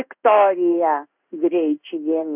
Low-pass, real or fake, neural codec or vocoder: 3.6 kHz; fake; codec, 16 kHz in and 24 kHz out, 1 kbps, XY-Tokenizer